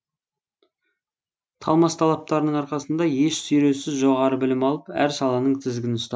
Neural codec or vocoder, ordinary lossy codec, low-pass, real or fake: none; none; none; real